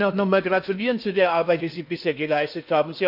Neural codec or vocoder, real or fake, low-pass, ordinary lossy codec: codec, 16 kHz in and 24 kHz out, 0.8 kbps, FocalCodec, streaming, 65536 codes; fake; 5.4 kHz; none